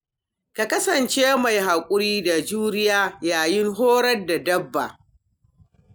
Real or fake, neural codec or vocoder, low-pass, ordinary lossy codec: real; none; none; none